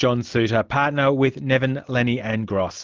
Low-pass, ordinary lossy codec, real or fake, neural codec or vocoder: 7.2 kHz; Opus, 24 kbps; real; none